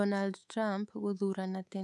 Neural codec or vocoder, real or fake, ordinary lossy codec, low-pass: codec, 24 kHz, 3.1 kbps, DualCodec; fake; none; none